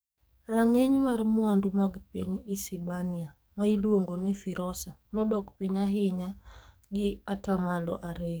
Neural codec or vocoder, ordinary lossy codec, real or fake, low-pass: codec, 44.1 kHz, 2.6 kbps, SNAC; none; fake; none